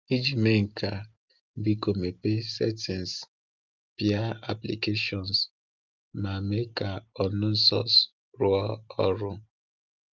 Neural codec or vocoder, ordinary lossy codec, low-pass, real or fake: none; Opus, 24 kbps; 7.2 kHz; real